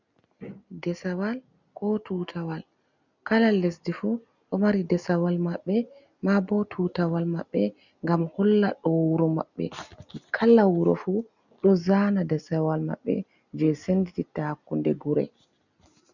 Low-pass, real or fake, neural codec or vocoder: 7.2 kHz; real; none